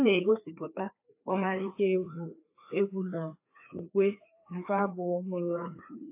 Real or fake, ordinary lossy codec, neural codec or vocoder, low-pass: fake; AAC, 24 kbps; codec, 16 kHz, 2 kbps, FreqCodec, larger model; 3.6 kHz